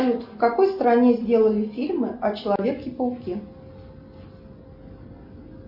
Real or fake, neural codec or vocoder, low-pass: real; none; 5.4 kHz